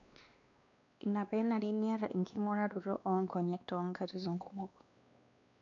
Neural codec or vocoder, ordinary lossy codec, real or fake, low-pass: codec, 16 kHz, 2 kbps, X-Codec, WavLM features, trained on Multilingual LibriSpeech; none; fake; 7.2 kHz